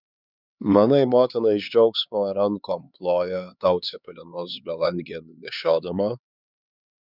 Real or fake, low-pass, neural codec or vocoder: fake; 5.4 kHz; codec, 16 kHz, 4 kbps, X-Codec, HuBERT features, trained on LibriSpeech